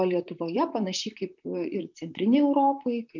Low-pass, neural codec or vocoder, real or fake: 7.2 kHz; vocoder, 44.1 kHz, 128 mel bands, Pupu-Vocoder; fake